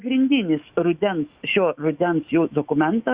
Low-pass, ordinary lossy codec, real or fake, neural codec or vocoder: 3.6 kHz; Opus, 64 kbps; fake; autoencoder, 48 kHz, 128 numbers a frame, DAC-VAE, trained on Japanese speech